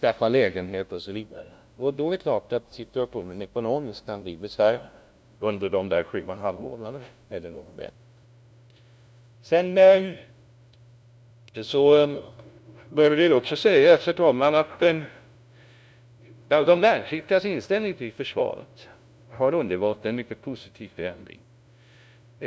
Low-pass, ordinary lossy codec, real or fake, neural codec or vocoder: none; none; fake; codec, 16 kHz, 0.5 kbps, FunCodec, trained on LibriTTS, 25 frames a second